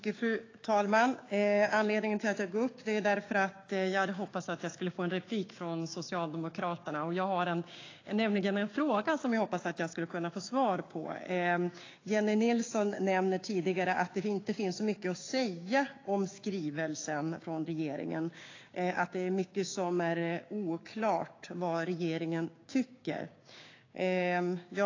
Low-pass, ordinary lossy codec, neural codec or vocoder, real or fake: 7.2 kHz; AAC, 32 kbps; codec, 16 kHz, 6 kbps, DAC; fake